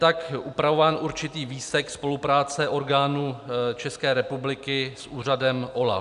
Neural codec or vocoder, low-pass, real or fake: none; 10.8 kHz; real